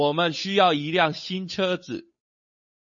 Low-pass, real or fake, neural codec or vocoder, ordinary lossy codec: 7.2 kHz; fake; codec, 16 kHz, 8 kbps, FunCodec, trained on Chinese and English, 25 frames a second; MP3, 32 kbps